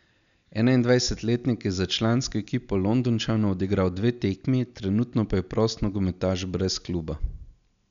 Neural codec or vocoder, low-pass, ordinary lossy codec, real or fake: none; 7.2 kHz; MP3, 96 kbps; real